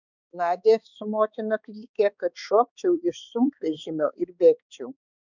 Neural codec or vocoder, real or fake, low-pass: codec, 16 kHz, 4 kbps, X-Codec, HuBERT features, trained on balanced general audio; fake; 7.2 kHz